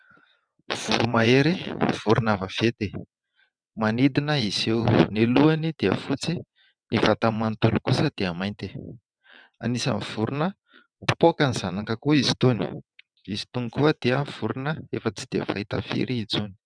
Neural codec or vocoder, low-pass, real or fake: vocoder, 22.05 kHz, 80 mel bands, WaveNeXt; 9.9 kHz; fake